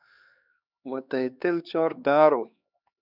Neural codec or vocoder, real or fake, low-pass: codec, 16 kHz, 2 kbps, X-Codec, HuBERT features, trained on LibriSpeech; fake; 5.4 kHz